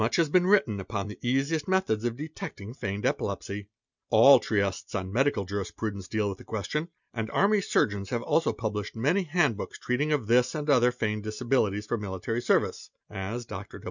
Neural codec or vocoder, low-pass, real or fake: none; 7.2 kHz; real